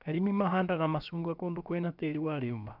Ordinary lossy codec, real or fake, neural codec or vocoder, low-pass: none; fake; codec, 16 kHz, about 1 kbps, DyCAST, with the encoder's durations; 5.4 kHz